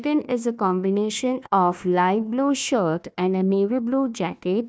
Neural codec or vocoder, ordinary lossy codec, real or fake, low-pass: codec, 16 kHz, 1 kbps, FunCodec, trained on Chinese and English, 50 frames a second; none; fake; none